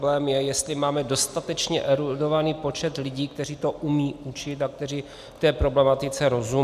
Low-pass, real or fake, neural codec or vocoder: 14.4 kHz; real; none